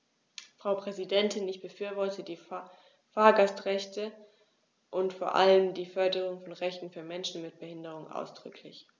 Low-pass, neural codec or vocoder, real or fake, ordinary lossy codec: none; none; real; none